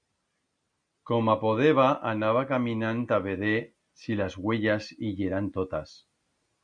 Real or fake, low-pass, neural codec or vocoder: real; 9.9 kHz; none